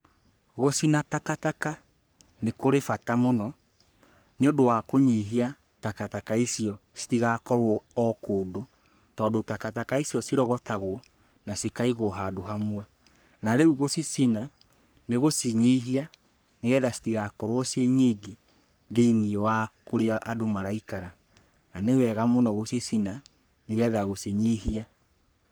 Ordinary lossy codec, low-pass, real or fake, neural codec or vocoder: none; none; fake; codec, 44.1 kHz, 3.4 kbps, Pupu-Codec